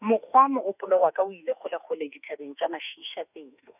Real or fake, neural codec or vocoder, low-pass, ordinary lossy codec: fake; autoencoder, 48 kHz, 32 numbers a frame, DAC-VAE, trained on Japanese speech; 3.6 kHz; none